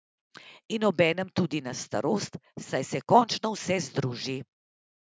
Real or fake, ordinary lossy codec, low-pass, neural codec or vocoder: real; none; none; none